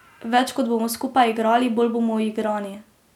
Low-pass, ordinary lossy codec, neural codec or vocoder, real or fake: 19.8 kHz; none; none; real